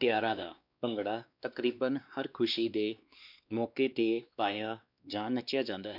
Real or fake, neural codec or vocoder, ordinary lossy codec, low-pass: fake; codec, 16 kHz, 2 kbps, X-Codec, WavLM features, trained on Multilingual LibriSpeech; none; 5.4 kHz